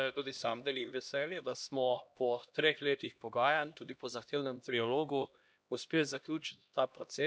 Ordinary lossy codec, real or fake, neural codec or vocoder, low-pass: none; fake; codec, 16 kHz, 1 kbps, X-Codec, HuBERT features, trained on LibriSpeech; none